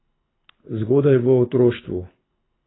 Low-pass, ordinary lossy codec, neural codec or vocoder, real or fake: 7.2 kHz; AAC, 16 kbps; codec, 24 kHz, 6 kbps, HILCodec; fake